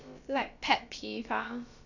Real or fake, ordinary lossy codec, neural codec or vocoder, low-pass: fake; none; codec, 16 kHz, about 1 kbps, DyCAST, with the encoder's durations; 7.2 kHz